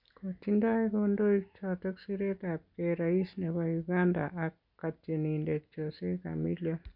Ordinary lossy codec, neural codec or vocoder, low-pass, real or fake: none; none; 5.4 kHz; real